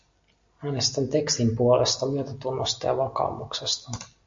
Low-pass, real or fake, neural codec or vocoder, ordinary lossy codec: 7.2 kHz; real; none; MP3, 48 kbps